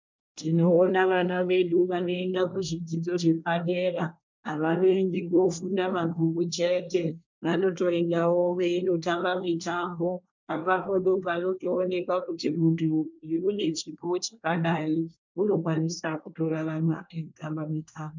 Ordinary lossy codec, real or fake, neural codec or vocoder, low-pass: MP3, 64 kbps; fake; codec, 24 kHz, 1 kbps, SNAC; 7.2 kHz